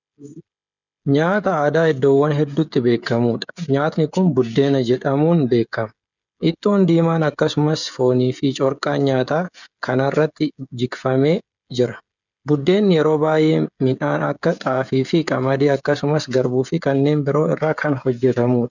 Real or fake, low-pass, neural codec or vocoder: fake; 7.2 kHz; codec, 16 kHz, 16 kbps, FreqCodec, smaller model